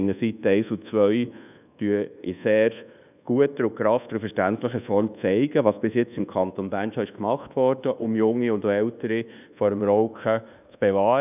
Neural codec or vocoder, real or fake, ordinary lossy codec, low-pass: codec, 24 kHz, 1.2 kbps, DualCodec; fake; none; 3.6 kHz